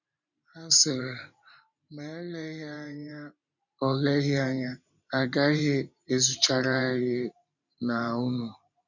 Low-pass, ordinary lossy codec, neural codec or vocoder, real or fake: 7.2 kHz; none; vocoder, 44.1 kHz, 128 mel bands every 512 samples, BigVGAN v2; fake